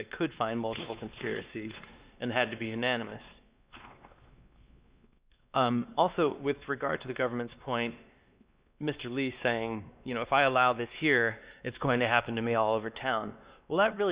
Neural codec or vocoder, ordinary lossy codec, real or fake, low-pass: codec, 16 kHz, 2 kbps, X-Codec, WavLM features, trained on Multilingual LibriSpeech; Opus, 64 kbps; fake; 3.6 kHz